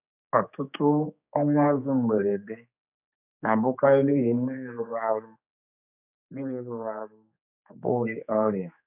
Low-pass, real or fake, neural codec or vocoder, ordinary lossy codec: 3.6 kHz; fake; codec, 16 kHz, 4 kbps, X-Codec, HuBERT features, trained on general audio; none